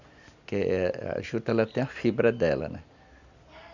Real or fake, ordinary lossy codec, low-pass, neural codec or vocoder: real; none; 7.2 kHz; none